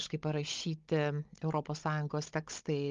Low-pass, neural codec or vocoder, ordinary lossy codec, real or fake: 7.2 kHz; codec, 16 kHz, 16 kbps, FunCodec, trained on Chinese and English, 50 frames a second; Opus, 32 kbps; fake